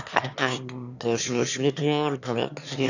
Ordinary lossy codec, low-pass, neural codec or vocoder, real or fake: none; 7.2 kHz; autoencoder, 22.05 kHz, a latent of 192 numbers a frame, VITS, trained on one speaker; fake